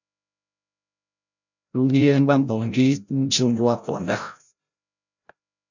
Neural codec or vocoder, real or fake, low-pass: codec, 16 kHz, 0.5 kbps, FreqCodec, larger model; fake; 7.2 kHz